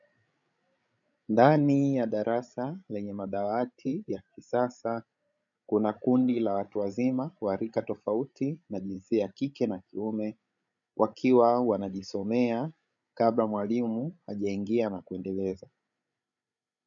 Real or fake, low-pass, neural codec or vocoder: fake; 7.2 kHz; codec, 16 kHz, 16 kbps, FreqCodec, larger model